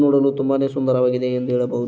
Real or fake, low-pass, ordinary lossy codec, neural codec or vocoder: real; none; none; none